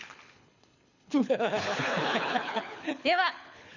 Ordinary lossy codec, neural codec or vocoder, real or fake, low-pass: none; codec, 24 kHz, 6 kbps, HILCodec; fake; 7.2 kHz